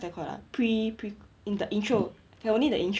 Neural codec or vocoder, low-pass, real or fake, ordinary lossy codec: none; none; real; none